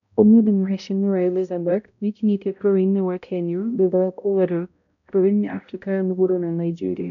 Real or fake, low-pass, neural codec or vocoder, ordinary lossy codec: fake; 7.2 kHz; codec, 16 kHz, 0.5 kbps, X-Codec, HuBERT features, trained on balanced general audio; none